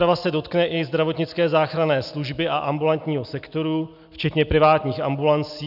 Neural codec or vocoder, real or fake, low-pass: none; real; 5.4 kHz